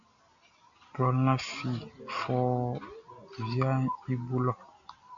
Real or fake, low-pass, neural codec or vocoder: real; 7.2 kHz; none